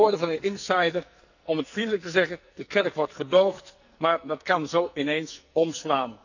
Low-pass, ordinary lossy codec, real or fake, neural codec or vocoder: 7.2 kHz; none; fake; codec, 44.1 kHz, 2.6 kbps, SNAC